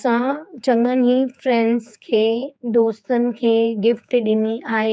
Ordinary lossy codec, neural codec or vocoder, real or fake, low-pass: none; codec, 16 kHz, 4 kbps, X-Codec, HuBERT features, trained on general audio; fake; none